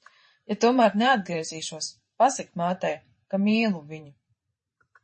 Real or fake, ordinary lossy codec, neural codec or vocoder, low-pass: fake; MP3, 32 kbps; vocoder, 44.1 kHz, 128 mel bands every 512 samples, BigVGAN v2; 10.8 kHz